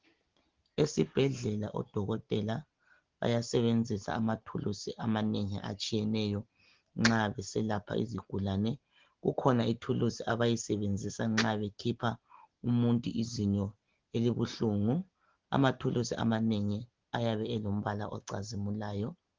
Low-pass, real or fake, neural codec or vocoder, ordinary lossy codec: 7.2 kHz; real; none; Opus, 16 kbps